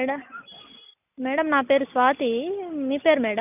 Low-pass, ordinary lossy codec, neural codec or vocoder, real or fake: 3.6 kHz; none; none; real